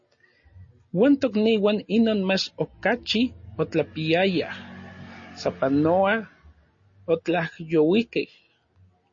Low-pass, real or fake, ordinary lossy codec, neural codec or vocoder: 7.2 kHz; real; MP3, 32 kbps; none